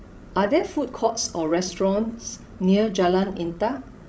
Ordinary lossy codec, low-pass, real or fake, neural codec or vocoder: none; none; fake; codec, 16 kHz, 16 kbps, FreqCodec, larger model